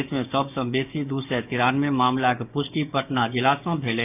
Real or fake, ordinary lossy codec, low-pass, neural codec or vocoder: fake; none; 3.6 kHz; codec, 16 kHz, 6 kbps, DAC